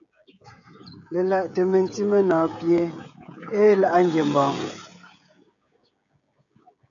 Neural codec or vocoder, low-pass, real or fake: codec, 16 kHz, 16 kbps, FreqCodec, smaller model; 7.2 kHz; fake